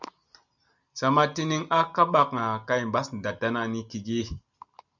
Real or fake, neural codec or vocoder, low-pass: real; none; 7.2 kHz